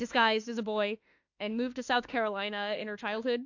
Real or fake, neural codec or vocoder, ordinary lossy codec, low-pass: fake; autoencoder, 48 kHz, 32 numbers a frame, DAC-VAE, trained on Japanese speech; AAC, 48 kbps; 7.2 kHz